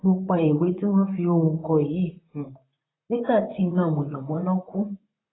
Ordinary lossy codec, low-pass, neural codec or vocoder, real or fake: AAC, 16 kbps; 7.2 kHz; vocoder, 44.1 kHz, 128 mel bands, Pupu-Vocoder; fake